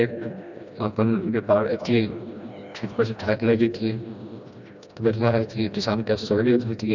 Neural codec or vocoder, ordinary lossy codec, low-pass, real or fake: codec, 16 kHz, 1 kbps, FreqCodec, smaller model; none; 7.2 kHz; fake